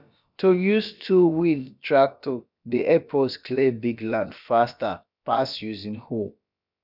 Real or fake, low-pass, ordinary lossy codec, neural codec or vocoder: fake; 5.4 kHz; none; codec, 16 kHz, about 1 kbps, DyCAST, with the encoder's durations